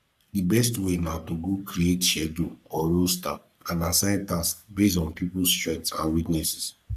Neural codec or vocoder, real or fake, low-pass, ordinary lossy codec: codec, 44.1 kHz, 3.4 kbps, Pupu-Codec; fake; 14.4 kHz; none